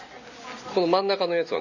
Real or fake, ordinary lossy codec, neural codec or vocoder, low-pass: real; none; none; 7.2 kHz